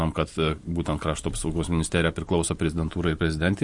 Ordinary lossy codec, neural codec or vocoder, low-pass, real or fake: MP3, 48 kbps; none; 10.8 kHz; real